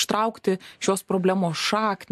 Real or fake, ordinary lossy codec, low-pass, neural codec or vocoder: real; MP3, 64 kbps; 14.4 kHz; none